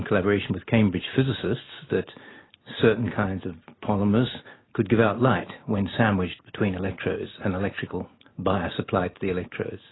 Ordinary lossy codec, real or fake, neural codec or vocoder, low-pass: AAC, 16 kbps; real; none; 7.2 kHz